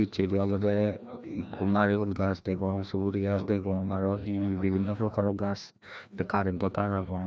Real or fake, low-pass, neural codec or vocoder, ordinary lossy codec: fake; none; codec, 16 kHz, 1 kbps, FreqCodec, larger model; none